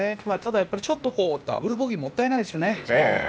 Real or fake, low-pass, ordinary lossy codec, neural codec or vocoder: fake; none; none; codec, 16 kHz, 0.8 kbps, ZipCodec